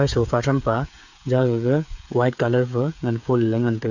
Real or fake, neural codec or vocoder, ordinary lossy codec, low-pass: fake; codec, 16 kHz, 8 kbps, FreqCodec, smaller model; none; 7.2 kHz